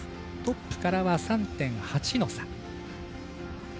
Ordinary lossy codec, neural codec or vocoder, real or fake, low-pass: none; none; real; none